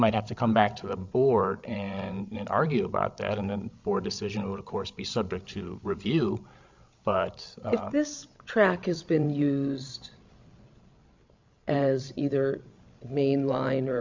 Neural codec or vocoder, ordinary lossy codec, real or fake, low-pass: codec, 16 kHz, 16 kbps, FreqCodec, larger model; MP3, 64 kbps; fake; 7.2 kHz